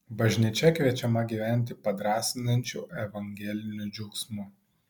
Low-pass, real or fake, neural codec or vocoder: 19.8 kHz; real; none